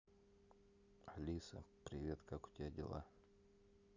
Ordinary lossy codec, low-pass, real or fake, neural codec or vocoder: none; 7.2 kHz; real; none